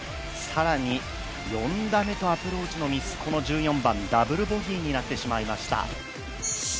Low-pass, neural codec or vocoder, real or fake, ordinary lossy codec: none; none; real; none